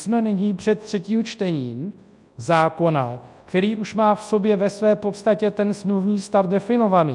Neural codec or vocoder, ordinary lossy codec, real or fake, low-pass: codec, 24 kHz, 0.9 kbps, WavTokenizer, large speech release; MP3, 64 kbps; fake; 10.8 kHz